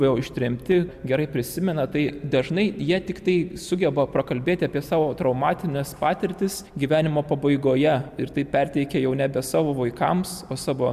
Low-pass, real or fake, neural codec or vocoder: 14.4 kHz; real; none